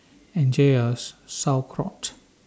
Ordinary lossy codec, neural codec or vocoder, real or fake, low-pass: none; none; real; none